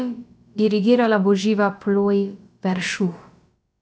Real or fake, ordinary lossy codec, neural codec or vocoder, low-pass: fake; none; codec, 16 kHz, about 1 kbps, DyCAST, with the encoder's durations; none